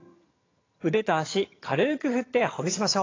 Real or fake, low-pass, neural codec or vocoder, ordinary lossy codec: fake; 7.2 kHz; vocoder, 22.05 kHz, 80 mel bands, HiFi-GAN; AAC, 32 kbps